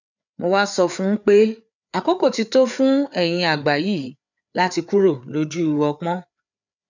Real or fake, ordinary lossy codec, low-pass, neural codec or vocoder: fake; none; 7.2 kHz; codec, 16 kHz, 4 kbps, FreqCodec, larger model